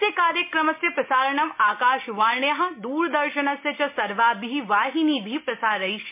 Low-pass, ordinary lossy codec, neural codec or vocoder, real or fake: 3.6 kHz; MP3, 32 kbps; none; real